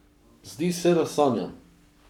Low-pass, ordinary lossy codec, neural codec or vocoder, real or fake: 19.8 kHz; none; codec, 44.1 kHz, 7.8 kbps, Pupu-Codec; fake